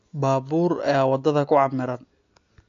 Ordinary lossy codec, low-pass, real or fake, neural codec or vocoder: AAC, 48 kbps; 7.2 kHz; real; none